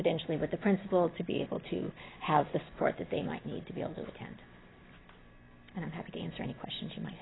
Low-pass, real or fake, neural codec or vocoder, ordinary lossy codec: 7.2 kHz; real; none; AAC, 16 kbps